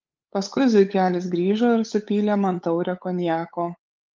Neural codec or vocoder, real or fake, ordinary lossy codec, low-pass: codec, 16 kHz, 8 kbps, FunCodec, trained on LibriTTS, 25 frames a second; fake; Opus, 24 kbps; 7.2 kHz